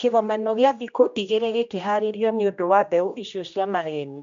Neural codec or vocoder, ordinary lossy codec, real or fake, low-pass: codec, 16 kHz, 1 kbps, X-Codec, HuBERT features, trained on general audio; MP3, 64 kbps; fake; 7.2 kHz